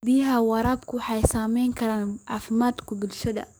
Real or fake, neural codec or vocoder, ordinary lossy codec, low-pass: fake; codec, 44.1 kHz, 7.8 kbps, DAC; none; none